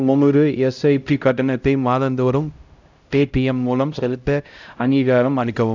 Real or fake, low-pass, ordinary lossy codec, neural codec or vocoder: fake; 7.2 kHz; none; codec, 16 kHz, 0.5 kbps, X-Codec, HuBERT features, trained on LibriSpeech